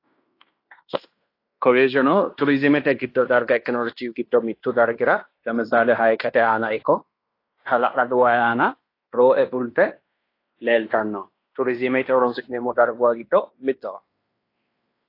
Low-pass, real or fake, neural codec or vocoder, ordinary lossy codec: 5.4 kHz; fake; codec, 16 kHz in and 24 kHz out, 0.9 kbps, LongCat-Audio-Codec, fine tuned four codebook decoder; AAC, 32 kbps